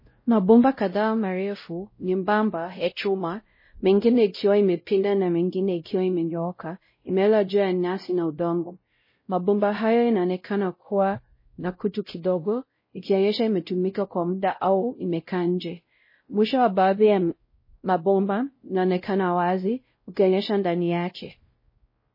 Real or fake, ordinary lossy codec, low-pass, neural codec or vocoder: fake; MP3, 24 kbps; 5.4 kHz; codec, 16 kHz, 0.5 kbps, X-Codec, WavLM features, trained on Multilingual LibriSpeech